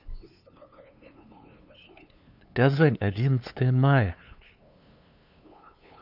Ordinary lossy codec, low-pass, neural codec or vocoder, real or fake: none; 5.4 kHz; codec, 16 kHz, 2 kbps, FunCodec, trained on LibriTTS, 25 frames a second; fake